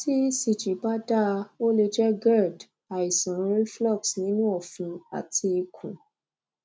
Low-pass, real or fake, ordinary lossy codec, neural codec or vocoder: none; real; none; none